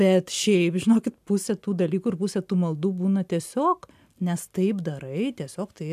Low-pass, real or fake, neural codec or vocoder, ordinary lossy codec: 14.4 kHz; real; none; AAC, 96 kbps